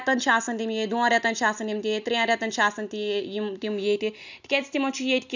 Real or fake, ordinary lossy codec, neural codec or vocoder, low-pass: real; none; none; 7.2 kHz